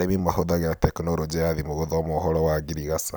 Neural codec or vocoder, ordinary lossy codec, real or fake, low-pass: none; none; real; none